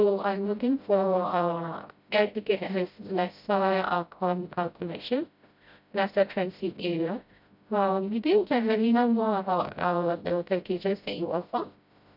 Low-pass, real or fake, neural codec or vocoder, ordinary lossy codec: 5.4 kHz; fake; codec, 16 kHz, 0.5 kbps, FreqCodec, smaller model; none